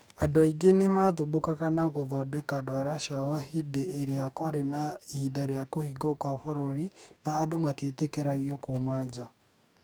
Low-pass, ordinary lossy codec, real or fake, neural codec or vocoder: none; none; fake; codec, 44.1 kHz, 2.6 kbps, DAC